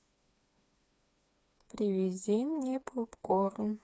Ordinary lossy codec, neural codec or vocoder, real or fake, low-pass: none; codec, 16 kHz, 4 kbps, FreqCodec, smaller model; fake; none